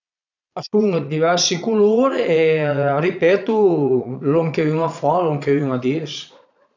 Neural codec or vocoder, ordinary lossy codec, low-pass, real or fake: vocoder, 22.05 kHz, 80 mel bands, Vocos; none; 7.2 kHz; fake